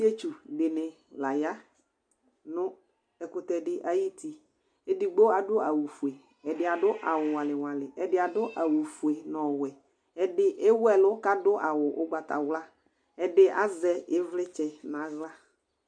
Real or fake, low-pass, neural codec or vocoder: real; 9.9 kHz; none